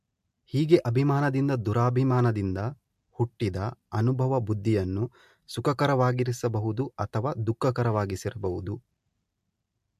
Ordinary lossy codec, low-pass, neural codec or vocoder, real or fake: MP3, 64 kbps; 14.4 kHz; none; real